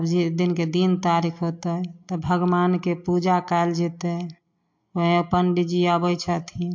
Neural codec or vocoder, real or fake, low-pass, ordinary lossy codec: none; real; 7.2 kHz; MP3, 48 kbps